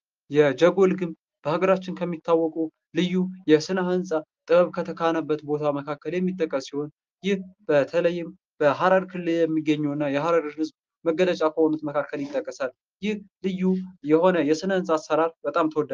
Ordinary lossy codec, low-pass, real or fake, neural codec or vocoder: Opus, 24 kbps; 7.2 kHz; real; none